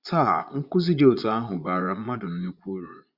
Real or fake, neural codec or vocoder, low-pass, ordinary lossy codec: fake; vocoder, 22.05 kHz, 80 mel bands, Vocos; 5.4 kHz; Opus, 64 kbps